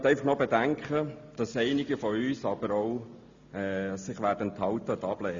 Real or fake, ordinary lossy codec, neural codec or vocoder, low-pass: real; Opus, 64 kbps; none; 7.2 kHz